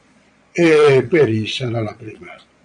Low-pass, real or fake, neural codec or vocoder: 9.9 kHz; fake; vocoder, 22.05 kHz, 80 mel bands, Vocos